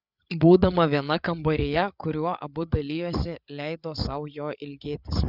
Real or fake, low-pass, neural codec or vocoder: fake; 5.4 kHz; codec, 16 kHz, 8 kbps, FreqCodec, larger model